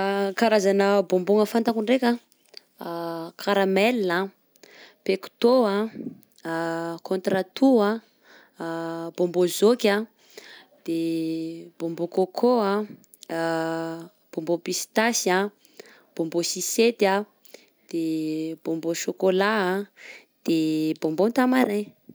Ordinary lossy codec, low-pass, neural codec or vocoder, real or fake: none; none; none; real